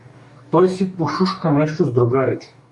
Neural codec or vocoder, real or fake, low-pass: codec, 44.1 kHz, 2.6 kbps, DAC; fake; 10.8 kHz